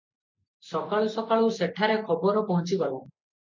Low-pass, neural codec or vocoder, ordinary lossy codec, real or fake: 7.2 kHz; none; MP3, 64 kbps; real